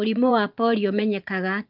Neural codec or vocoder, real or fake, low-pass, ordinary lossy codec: vocoder, 24 kHz, 100 mel bands, Vocos; fake; 5.4 kHz; Opus, 24 kbps